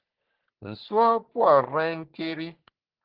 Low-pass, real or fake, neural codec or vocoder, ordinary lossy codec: 5.4 kHz; fake; codec, 44.1 kHz, 3.4 kbps, Pupu-Codec; Opus, 16 kbps